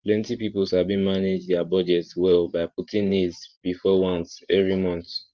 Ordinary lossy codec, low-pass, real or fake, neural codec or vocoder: Opus, 24 kbps; 7.2 kHz; fake; vocoder, 44.1 kHz, 128 mel bands every 512 samples, BigVGAN v2